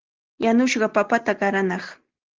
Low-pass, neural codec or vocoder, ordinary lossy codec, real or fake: 7.2 kHz; none; Opus, 16 kbps; real